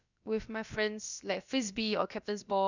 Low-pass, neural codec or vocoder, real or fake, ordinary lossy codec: 7.2 kHz; codec, 16 kHz, about 1 kbps, DyCAST, with the encoder's durations; fake; none